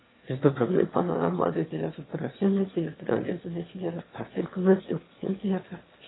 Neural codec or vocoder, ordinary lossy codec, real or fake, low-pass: autoencoder, 22.05 kHz, a latent of 192 numbers a frame, VITS, trained on one speaker; AAC, 16 kbps; fake; 7.2 kHz